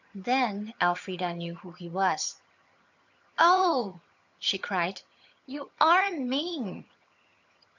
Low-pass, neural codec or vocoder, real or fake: 7.2 kHz; vocoder, 22.05 kHz, 80 mel bands, HiFi-GAN; fake